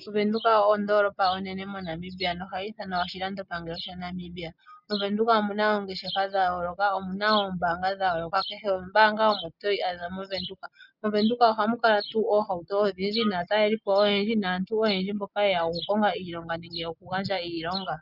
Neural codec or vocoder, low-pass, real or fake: none; 5.4 kHz; real